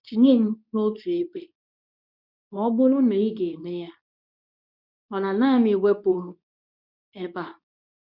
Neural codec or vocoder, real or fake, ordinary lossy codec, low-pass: codec, 24 kHz, 0.9 kbps, WavTokenizer, medium speech release version 2; fake; none; 5.4 kHz